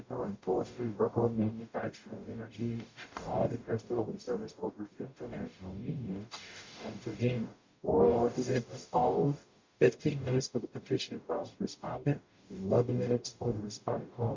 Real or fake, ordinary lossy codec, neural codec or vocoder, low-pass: fake; MP3, 48 kbps; codec, 44.1 kHz, 0.9 kbps, DAC; 7.2 kHz